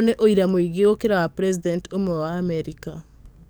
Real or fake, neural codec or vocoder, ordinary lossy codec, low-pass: fake; codec, 44.1 kHz, 7.8 kbps, DAC; none; none